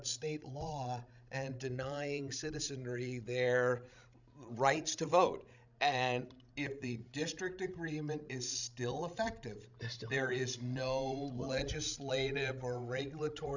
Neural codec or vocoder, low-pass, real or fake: codec, 16 kHz, 16 kbps, FreqCodec, larger model; 7.2 kHz; fake